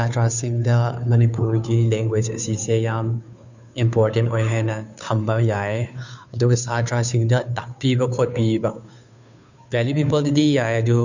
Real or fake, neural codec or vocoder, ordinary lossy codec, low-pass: fake; codec, 16 kHz, 2 kbps, FunCodec, trained on Chinese and English, 25 frames a second; none; 7.2 kHz